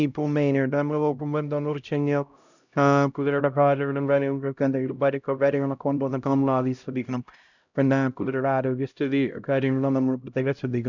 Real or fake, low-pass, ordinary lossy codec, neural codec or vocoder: fake; 7.2 kHz; none; codec, 16 kHz, 0.5 kbps, X-Codec, HuBERT features, trained on LibriSpeech